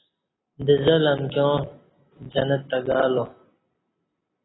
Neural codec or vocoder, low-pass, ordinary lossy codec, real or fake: none; 7.2 kHz; AAC, 16 kbps; real